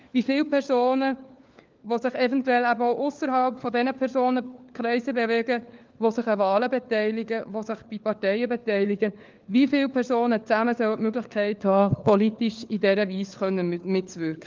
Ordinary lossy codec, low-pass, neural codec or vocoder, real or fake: Opus, 24 kbps; 7.2 kHz; codec, 16 kHz, 4 kbps, FunCodec, trained on LibriTTS, 50 frames a second; fake